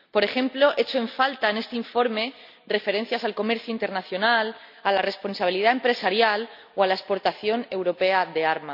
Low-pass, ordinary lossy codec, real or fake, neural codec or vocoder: 5.4 kHz; none; real; none